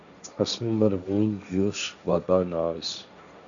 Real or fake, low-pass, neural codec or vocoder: fake; 7.2 kHz; codec, 16 kHz, 1.1 kbps, Voila-Tokenizer